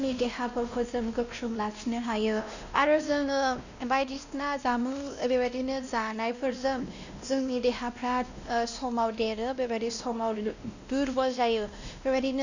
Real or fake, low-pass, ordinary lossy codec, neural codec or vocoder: fake; 7.2 kHz; none; codec, 16 kHz, 1 kbps, X-Codec, WavLM features, trained on Multilingual LibriSpeech